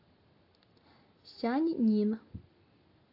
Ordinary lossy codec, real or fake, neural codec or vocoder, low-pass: AAC, 32 kbps; real; none; 5.4 kHz